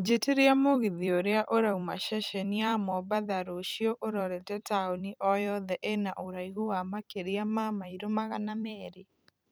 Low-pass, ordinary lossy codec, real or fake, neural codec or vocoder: none; none; fake; vocoder, 44.1 kHz, 128 mel bands every 256 samples, BigVGAN v2